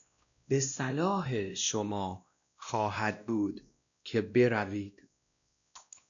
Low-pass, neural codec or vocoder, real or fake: 7.2 kHz; codec, 16 kHz, 1 kbps, X-Codec, WavLM features, trained on Multilingual LibriSpeech; fake